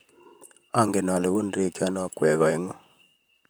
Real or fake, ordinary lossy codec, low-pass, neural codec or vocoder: fake; none; none; vocoder, 44.1 kHz, 128 mel bands, Pupu-Vocoder